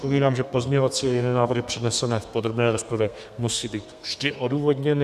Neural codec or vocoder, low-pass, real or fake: codec, 32 kHz, 1.9 kbps, SNAC; 14.4 kHz; fake